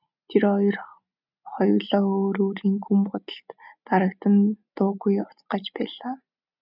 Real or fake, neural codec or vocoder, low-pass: real; none; 5.4 kHz